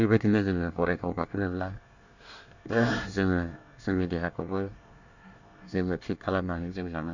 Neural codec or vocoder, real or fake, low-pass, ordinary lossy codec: codec, 24 kHz, 1 kbps, SNAC; fake; 7.2 kHz; none